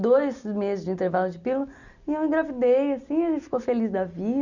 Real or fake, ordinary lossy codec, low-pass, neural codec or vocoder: real; none; 7.2 kHz; none